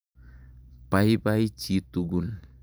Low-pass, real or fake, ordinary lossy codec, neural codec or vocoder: none; fake; none; vocoder, 44.1 kHz, 128 mel bands every 512 samples, BigVGAN v2